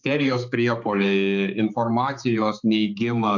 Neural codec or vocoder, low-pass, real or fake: codec, 16 kHz, 4 kbps, X-Codec, HuBERT features, trained on balanced general audio; 7.2 kHz; fake